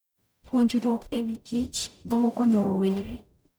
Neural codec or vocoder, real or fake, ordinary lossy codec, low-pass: codec, 44.1 kHz, 0.9 kbps, DAC; fake; none; none